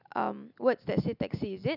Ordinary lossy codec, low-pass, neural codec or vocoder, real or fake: none; 5.4 kHz; none; real